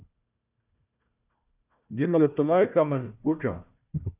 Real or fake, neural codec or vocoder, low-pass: fake; codec, 16 kHz, 1 kbps, FunCodec, trained on Chinese and English, 50 frames a second; 3.6 kHz